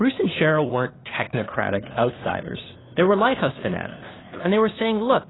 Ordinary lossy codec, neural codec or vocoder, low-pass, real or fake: AAC, 16 kbps; codec, 16 kHz, 2 kbps, FunCodec, trained on LibriTTS, 25 frames a second; 7.2 kHz; fake